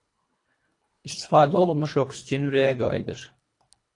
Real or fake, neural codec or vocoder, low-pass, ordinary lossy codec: fake; codec, 24 kHz, 1.5 kbps, HILCodec; 10.8 kHz; AAC, 48 kbps